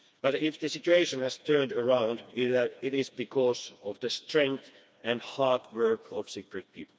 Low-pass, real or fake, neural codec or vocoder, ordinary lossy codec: none; fake; codec, 16 kHz, 2 kbps, FreqCodec, smaller model; none